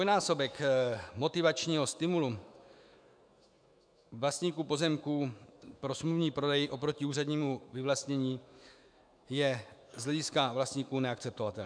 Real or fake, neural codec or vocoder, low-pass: fake; autoencoder, 48 kHz, 128 numbers a frame, DAC-VAE, trained on Japanese speech; 9.9 kHz